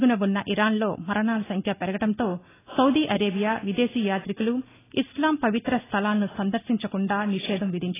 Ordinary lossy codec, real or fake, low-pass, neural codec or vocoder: AAC, 16 kbps; real; 3.6 kHz; none